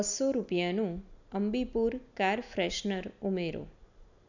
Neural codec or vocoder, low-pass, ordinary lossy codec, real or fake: none; 7.2 kHz; none; real